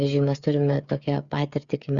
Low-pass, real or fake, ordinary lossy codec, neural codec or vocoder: 7.2 kHz; fake; Opus, 64 kbps; codec, 16 kHz, 8 kbps, FreqCodec, smaller model